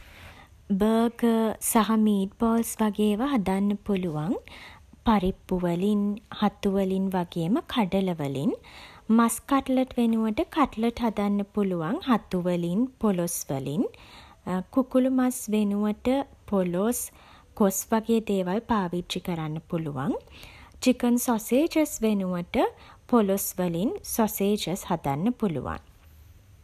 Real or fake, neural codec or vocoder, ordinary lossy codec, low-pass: real; none; none; 14.4 kHz